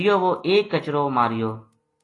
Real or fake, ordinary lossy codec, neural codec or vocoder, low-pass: real; AAC, 32 kbps; none; 10.8 kHz